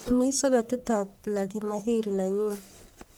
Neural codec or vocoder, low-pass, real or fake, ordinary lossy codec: codec, 44.1 kHz, 1.7 kbps, Pupu-Codec; none; fake; none